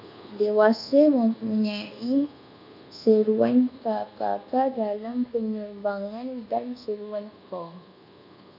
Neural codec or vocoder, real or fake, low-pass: codec, 24 kHz, 1.2 kbps, DualCodec; fake; 5.4 kHz